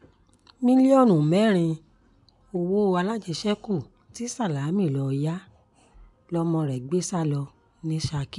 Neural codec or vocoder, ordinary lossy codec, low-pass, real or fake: none; MP3, 96 kbps; 10.8 kHz; real